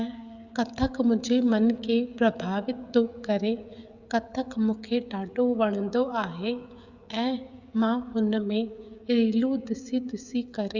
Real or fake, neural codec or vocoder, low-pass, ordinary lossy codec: fake; codec, 16 kHz, 16 kbps, FreqCodec, smaller model; 7.2 kHz; Opus, 64 kbps